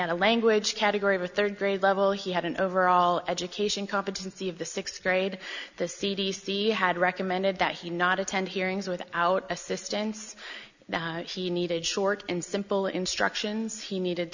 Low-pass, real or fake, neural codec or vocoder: 7.2 kHz; real; none